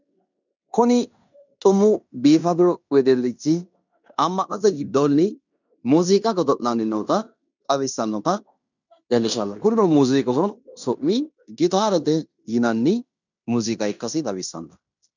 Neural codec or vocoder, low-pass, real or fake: codec, 16 kHz in and 24 kHz out, 0.9 kbps, LongCat-Audio-Codec, fine tuned four codebook decoder; 7.2 kHz; fake